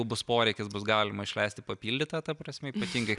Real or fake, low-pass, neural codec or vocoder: real; 10.8 kHz; none